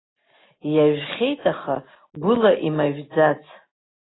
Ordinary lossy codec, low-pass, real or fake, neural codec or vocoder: AAC, 16 kbps; 7.2 kHz; real; none